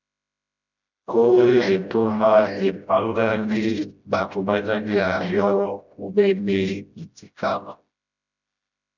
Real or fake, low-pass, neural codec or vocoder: fake; 7.2 kHz; codec, 16 kHz, 0.5 kbps, FreqCodec, smaller model